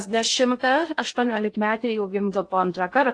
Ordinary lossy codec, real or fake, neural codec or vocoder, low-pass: AAC, 48 kbps; fake; codec, 16 kHz in and 24 kHz out, 0.6 kbps, FocalCodec, streaming, 2048 codes; 9.9 kHz